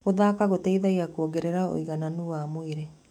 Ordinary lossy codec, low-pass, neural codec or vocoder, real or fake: MP3, 96 kbps; 19.8 kHz; autoencoder, 48 kHz, 128 numbers a frame, DAC-VAE, trained on Japanese speech; fake